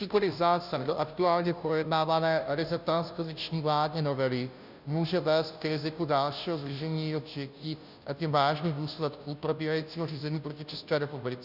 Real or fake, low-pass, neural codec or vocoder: fake; 5.4 kHz; codec, 16 kHz, 0.5 kbps, FunCodec, trained on Chinese and English, 25 frames a second